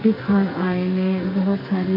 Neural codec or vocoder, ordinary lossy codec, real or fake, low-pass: codec, 44.1 kHz, 2.6 kbps, SNAC; MP3, 32 kbps; fake; 5.4 kHz